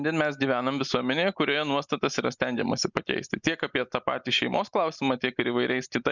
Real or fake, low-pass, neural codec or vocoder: real; 7.2 kHz; none